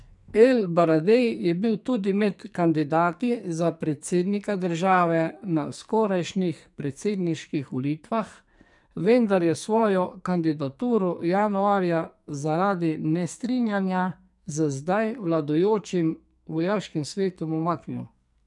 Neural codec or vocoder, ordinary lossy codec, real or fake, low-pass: codec, 44.1 kHz, 2.6 kbps, SNAC; none; fake; 10.8 kHz